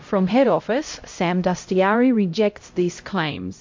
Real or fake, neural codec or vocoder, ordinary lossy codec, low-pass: fake; codec, 16 kHz, 0.5 kbps, X-Codec, HuBERT features, trained on LibriSpeech; MP3, 48 kbps; 7.2 kHz